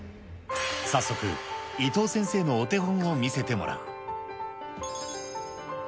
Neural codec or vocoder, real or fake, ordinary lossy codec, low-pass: none; real; none; none